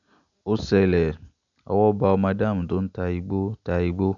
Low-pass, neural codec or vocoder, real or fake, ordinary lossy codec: 7.2 kHz; none; real; none